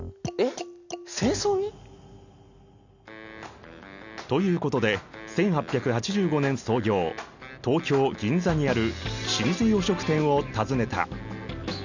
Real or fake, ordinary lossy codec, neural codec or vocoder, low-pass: real; none; none; 7.2 kHz